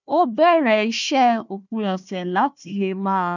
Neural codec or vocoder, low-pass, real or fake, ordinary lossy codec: codec, 16 kHz, 1 kbps, FunCodec, trained on Chinese and English, 50 frames a second; 7.2 kHz; fake; none